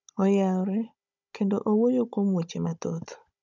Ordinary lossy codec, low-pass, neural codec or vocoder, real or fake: none; 7.2 kHz; codec, 16 kHz, 16 kbps, FunCodec, trained on Chinese and English, 50 frames a second; fake